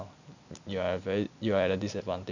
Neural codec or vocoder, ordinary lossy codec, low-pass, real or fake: none; none; 7.2 kHz; real